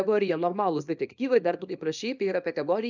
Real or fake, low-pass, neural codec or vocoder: fake; 7.2 kHz; codec, 24 kHz, 0.9 kbps, WavTokenizer, small release